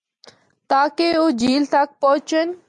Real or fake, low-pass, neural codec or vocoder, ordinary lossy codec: real; 10.8 kHz; none; MP3, 64 kbps